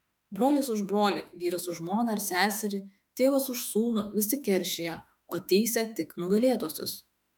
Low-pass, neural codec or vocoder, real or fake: 19.8 kHz; autoencoder, 48 kHz, 32 numbers a frame, DAC-VAE, trained on Japanese speech; fake